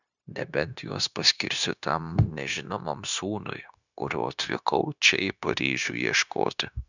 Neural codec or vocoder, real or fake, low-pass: codec, 16 kHz, 0.9 kbps, LongCat-Audio-Codec; fake; 7.2 kHz